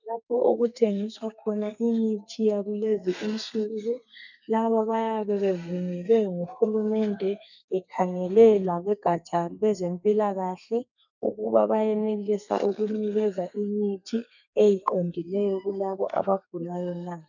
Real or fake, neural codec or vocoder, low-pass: fake; codec, 32 kHz, 1.9 kbps, SNAC; 7.2 kHz